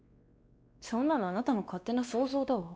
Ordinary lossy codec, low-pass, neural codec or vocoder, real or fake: none; none; codec, 16 kHz, 2 kbps, X-Codec, WavLM features, trained on Multilingual LibriSpeech; fake